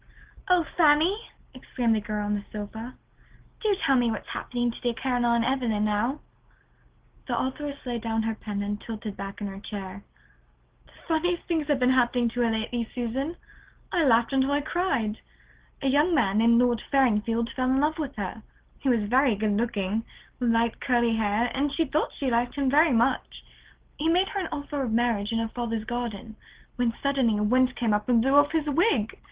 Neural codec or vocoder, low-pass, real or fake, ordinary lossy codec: none; 3.6 kHz; real; Opus, 16 kbps